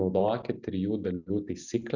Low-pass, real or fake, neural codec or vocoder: 7.2 kHz; real; none